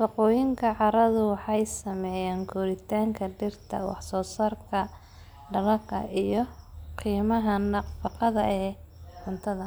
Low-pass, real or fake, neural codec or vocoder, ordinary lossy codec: none; real; none; none